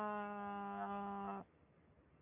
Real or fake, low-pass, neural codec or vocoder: real; 3.6 kHz; none